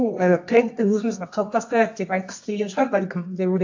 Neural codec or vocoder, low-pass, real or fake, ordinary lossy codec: codec, 24 kHz, 0.9 kbps, WavTokenizer, medium music audio release; 7.2 kHz; fake; AAC, 48 kbps